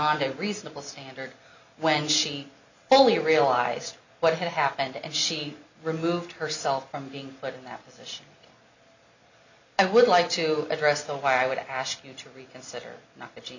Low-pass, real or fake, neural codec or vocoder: 7.2 kHz; real; none